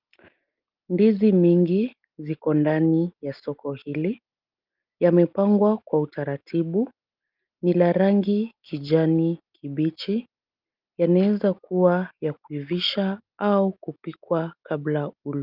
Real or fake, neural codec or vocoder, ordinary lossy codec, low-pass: real; none; Opus, 24 kbps; 5.4 kHz